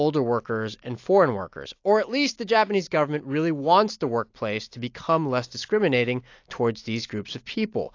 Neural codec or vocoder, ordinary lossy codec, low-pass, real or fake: none; AAC, 48 kbps; 7.2 kHz; real